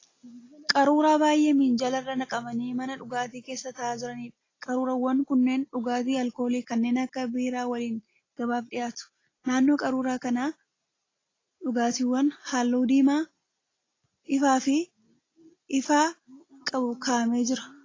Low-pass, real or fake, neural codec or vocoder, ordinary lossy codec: 7.2 kHz; real; none; AAC, 32 kbps